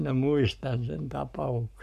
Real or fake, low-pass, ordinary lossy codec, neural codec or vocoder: fake; 14.4 kHz; none; codec, 44.1 kHz, 7.8 kbps, Pupu-Codec